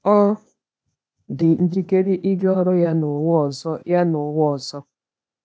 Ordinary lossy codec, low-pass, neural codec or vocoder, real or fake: none; none; codec, 16 kHz, 0.8 kbps, ZipCodec; fake